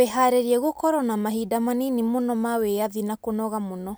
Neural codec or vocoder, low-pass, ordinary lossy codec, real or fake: none; none; none; real